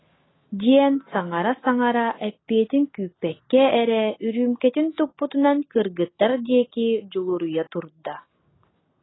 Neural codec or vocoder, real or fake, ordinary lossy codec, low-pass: none; real; AAC, 16 kbps; 7.2 kHz